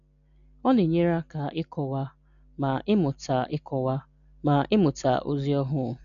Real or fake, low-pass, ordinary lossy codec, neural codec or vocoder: real; 7.2 kHz; none; none